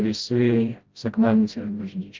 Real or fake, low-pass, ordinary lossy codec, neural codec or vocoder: fake; 7.2 kHz; Opus, 32 kbps; codec, 16 kHz, 0.5 kbps, FreqCodec, smaller model